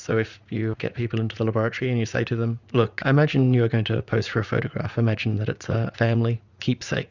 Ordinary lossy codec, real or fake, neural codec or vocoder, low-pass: Opus, 64 kbps; fake; vocoder, 44.1 kHz, 80 mel bands, Vocos; 7.2 kHz